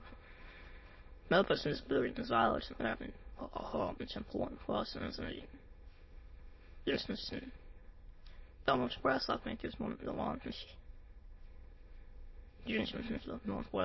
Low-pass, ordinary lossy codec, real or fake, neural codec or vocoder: 7.2 kHz; MP3, 24 kbps; fake; autoencoder, 22.05 kHz, a latent of 192 numbers a frame, VITS, trained on many speakers